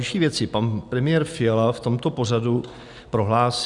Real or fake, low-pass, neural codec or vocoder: real; 10.8 kHz; none